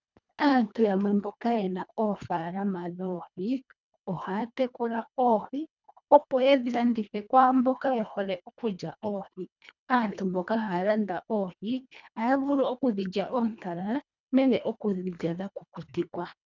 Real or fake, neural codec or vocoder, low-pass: fake; codec, 24 kHz, 1.5 kbps, HILCodec; 7.2 kHz